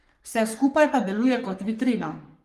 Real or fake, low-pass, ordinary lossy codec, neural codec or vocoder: fake; 14.4 kHz; Opus, 32 kbps; codec, 44.1 kHz, 3.4 kbps, Pupu-Codec